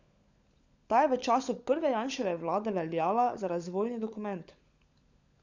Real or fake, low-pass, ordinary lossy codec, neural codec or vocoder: fake; 7.2 kHz; Opus, 64 kbps; codec, 16 kHz, 16 kbps, FunCodec, trained on LibriTTS, 50 frames a second